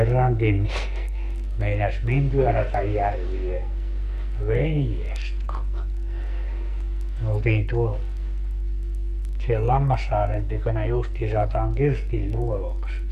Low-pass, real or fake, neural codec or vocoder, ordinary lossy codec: 14.4 kHz; fake; codec, 44.1 kHz, 2.6 kbps, SNAC; none